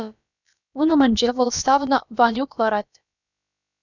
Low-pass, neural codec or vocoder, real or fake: 7.2 kHz; codec, 16 kHz, about 1 kbps, DyCAST, with the encoder's durations; fake